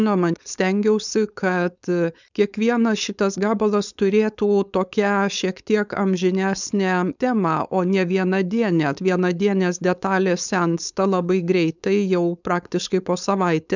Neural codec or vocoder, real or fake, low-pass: codec, 16 kHz, 4.8 kbps, FACodec; fake; 7.2 kHz